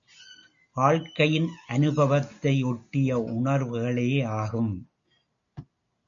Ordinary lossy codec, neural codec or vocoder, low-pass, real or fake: MP3, 64 kbps; none; 7.2 kHz; real